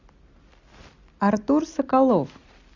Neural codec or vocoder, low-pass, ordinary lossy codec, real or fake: none; 7.2 kHz; Opus, 64 kbps; real